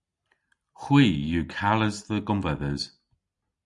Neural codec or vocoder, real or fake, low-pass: none; real; 10.8 kHz